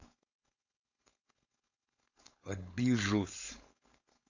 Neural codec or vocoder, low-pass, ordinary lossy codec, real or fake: codec, 16 kHz, 4.8 kbps, FACodec; 7.2 kHz; AAC, 48 kbps; fake